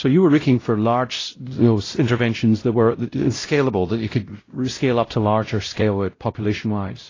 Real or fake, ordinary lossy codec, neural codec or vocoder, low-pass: fake; AAC, 32 kbps; codec, 16 kHz, 0.5 kbps, X-Codec, WavLM features, trained on Multilingual LibriSpeech; 7.2 kHz